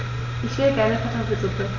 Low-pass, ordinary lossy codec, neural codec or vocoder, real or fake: 7.2 kHz; none; none; real